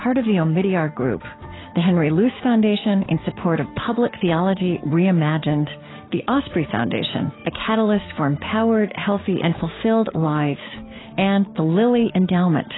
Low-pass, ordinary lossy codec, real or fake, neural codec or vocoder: 7.2 kHz; AAC, 16 kbps; fake; codec, 16 kHz, 6 kbps, DAC